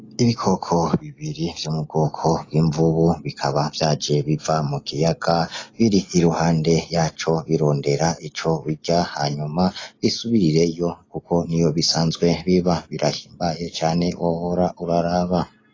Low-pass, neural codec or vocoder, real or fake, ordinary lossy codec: 7.2 kHz; none; real; AAC, 32 kbps